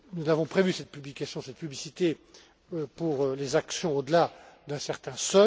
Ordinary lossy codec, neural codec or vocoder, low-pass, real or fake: none; none; none; real